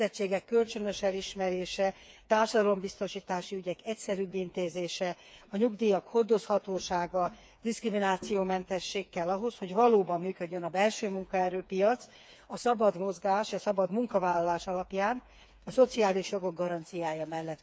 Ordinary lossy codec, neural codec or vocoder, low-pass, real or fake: none; codec, 16 kHz, 4 kbps, FreqCodec, smaller model; none; fake